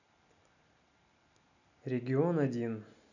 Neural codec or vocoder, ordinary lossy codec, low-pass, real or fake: none; none; 7.2 kHz; real